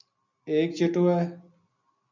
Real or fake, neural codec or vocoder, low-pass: real; none; 7.2 kHz